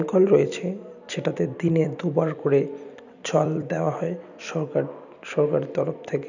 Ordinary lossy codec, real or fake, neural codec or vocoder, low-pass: none; fake; vocoder, 44.1 kHz, 128 mel bands every 256 samples, BigVGAN v2; 7.2 kHz